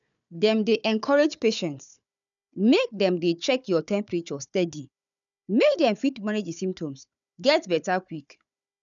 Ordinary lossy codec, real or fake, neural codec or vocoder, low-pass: none; fake; codec, 16 kHz, 4 kbps, FunCodec, trained on Chinese and English, 50 frames a second; 7.2 kHz